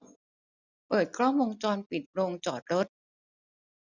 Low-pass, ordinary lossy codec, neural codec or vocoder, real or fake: 7.2 kHz; none; none; real